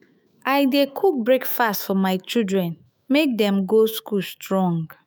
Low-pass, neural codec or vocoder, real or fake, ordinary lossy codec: none; autoencoder, 48 kHz, 128 numbers a frame, DAC-VAE, trained on Japanese speech; fake; none